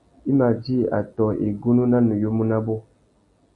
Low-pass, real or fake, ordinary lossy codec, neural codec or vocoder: 10.8 kHz; real; MP3, 64 kbps; none